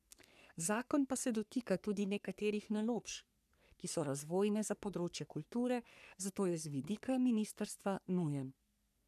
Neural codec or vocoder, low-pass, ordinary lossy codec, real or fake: codec, 44.1 kHz, 3.4 kbps, Pupu-Codec; 14.4 kHz; none; fake